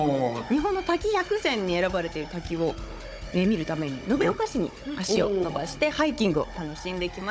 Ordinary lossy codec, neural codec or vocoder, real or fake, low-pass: none; codec, 16 kHz, 16 kbps, FunCodec, trained on Chinese and English, 50 frames a second; fake; none